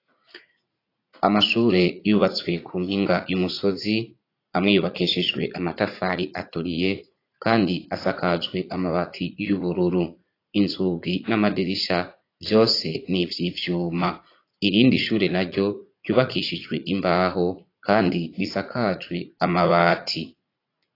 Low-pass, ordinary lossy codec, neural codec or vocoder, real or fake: 5.4 kHz; AAC, 32 kbps; vocoder, 44.1 kHz, 80 mel bands, Vocos; fake